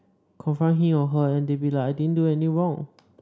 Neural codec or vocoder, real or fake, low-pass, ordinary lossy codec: none; real; none; none